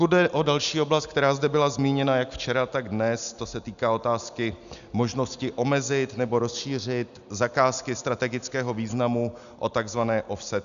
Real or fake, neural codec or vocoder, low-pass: real; none; 7.2 kHz